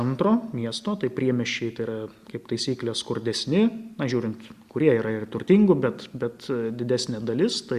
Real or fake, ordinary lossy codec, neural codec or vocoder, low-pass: real; Opus, 64 kbps; none; 14.4 kHz